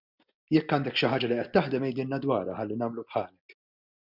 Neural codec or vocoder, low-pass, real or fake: none; 5.4 kHz; real